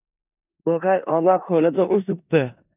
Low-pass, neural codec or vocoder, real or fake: 3.6 kHz; codec, 16 kHz in and 24 kHz out, 0.4 kbps, LongCat-Audio-Codec, four codebook decoder; fake